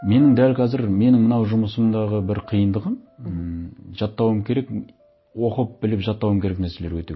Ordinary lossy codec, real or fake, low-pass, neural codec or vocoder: MP3, 24 kbps; real; 7.2 kHz; none